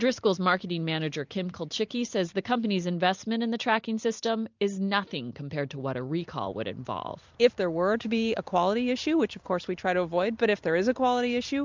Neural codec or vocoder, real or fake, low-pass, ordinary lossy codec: vocoder, 44.1 kHz, 128 mel bands every 256 samples, BigVGAN v2; fake; 7.2 kHz; MP3, 64 kbps